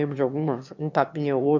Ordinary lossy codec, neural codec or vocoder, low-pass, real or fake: MP3, 64 kbps; autoencoder, 22.05 kHz, a latent of 192 numbers a frame, VITS, trained on one speaker; 7.2 kHz; fake